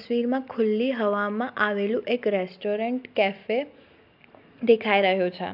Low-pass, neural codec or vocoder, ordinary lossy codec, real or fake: 5.4 kHz; none; AAC, 48 kbps; real